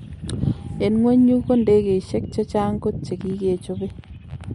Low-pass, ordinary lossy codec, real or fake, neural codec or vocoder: 19.8 kHz; MP3, 48 kbps; real; none